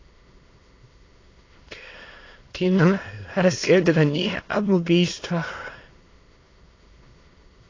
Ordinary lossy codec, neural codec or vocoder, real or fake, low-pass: AAC, 32 kbps; autoencoder, 22.05 kHz, a latent of 192 numbers a frame, VITS, trained on many speakers; fake; 7.2 kHz